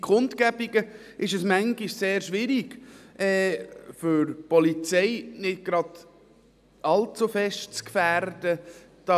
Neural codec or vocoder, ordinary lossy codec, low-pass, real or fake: none; none; 14.4 kHz; real